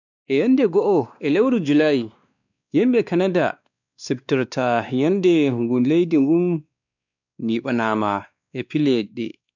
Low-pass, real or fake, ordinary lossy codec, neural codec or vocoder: 7.2 kHz; fake; none; codec, 16 kHz, 2 kbps, X-Codec, WavLM features, trained on Multilingual LibriSpeech